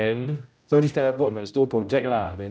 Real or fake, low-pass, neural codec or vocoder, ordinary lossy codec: fake; none; codec, 16 kHz, 0.5 kbps, X-Codec, HuBERT features, trained on general audio; none